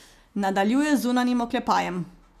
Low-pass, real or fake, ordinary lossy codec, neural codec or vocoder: 14.4 kHz; real; none; none